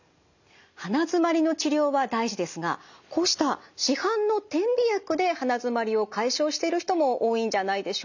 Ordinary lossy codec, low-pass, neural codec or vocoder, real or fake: none; 7.2 kHz; none; real